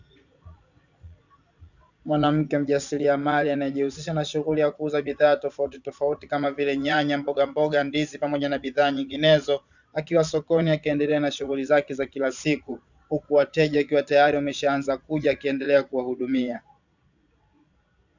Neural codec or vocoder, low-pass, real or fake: vocoder, 22.05 kHz, 80 mel bands, Vocos; 7.2 kHz; fake